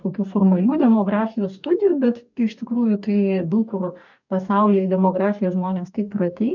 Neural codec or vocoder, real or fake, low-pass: codec, 44.1 kHz, 2.6 kbps, DAC; fake; 7.2 kHz